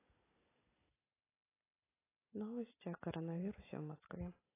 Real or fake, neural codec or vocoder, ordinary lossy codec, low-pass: real; none; AAC, 16 kbps; 3.6 kHz